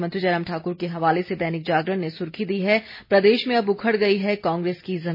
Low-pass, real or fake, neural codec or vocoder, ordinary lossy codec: 5.4 kHz; real; none; MP3, 24 kbps